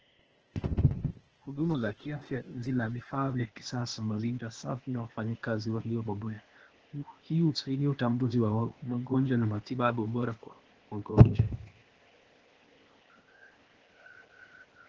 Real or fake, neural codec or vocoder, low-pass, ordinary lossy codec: fake; codec, 16 kHz, 0.8 kbps, ZipCodec; 7.2 kHz; Opus, 16 kbps